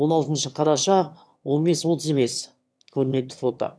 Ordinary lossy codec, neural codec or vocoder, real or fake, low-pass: none; autoencoder, 22.05 kHz, a latent of 192 numbers a frame, VITS, trained on one speaker; fake; none